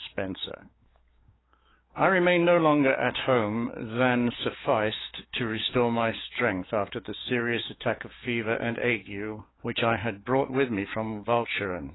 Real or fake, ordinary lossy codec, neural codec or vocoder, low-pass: fake; AAC, 16 kbps; codec, 16 kHz, 6 kbps, DAC; 7.2 kHz